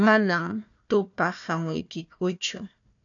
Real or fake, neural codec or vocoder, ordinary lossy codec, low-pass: fake; codec, 16 kHz, 1 kbps, FunCodec, trained on Chinese and English, 50 frames a second; MP3, 96 kbps; 7.2 kHz